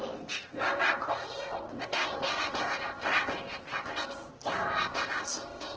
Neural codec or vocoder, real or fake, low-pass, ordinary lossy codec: codec, 16 kHz in and 24 kHz out, 0.6 kbps, FocalCodec, streaming, 4096 codes; fake; 7.2 kHz; Opus, 16 kbps